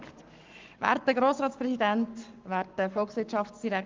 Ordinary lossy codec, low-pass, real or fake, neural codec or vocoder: Opus, 32 kbps; 7.2 kHz; fake; codec, 44.1 kHz, 7.8 kbps, Pupu-Codec